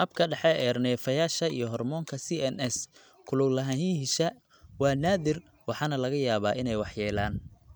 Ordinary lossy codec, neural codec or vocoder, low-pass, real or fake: none; none; none; real